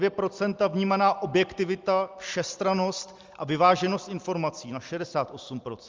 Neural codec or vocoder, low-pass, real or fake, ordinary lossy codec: none; 7.2 kHz; real; Opus, 32 kbps